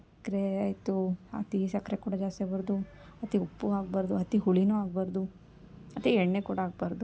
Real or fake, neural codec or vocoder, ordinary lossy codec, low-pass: real; none; none; none